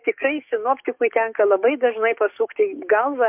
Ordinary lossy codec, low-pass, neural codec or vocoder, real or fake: MP3, 32 kbps; 3.6 kHz; codec, 44.1 kHz, 7.8 kbps, DAC; fake